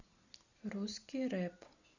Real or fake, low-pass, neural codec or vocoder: real; 7.2 kHz; none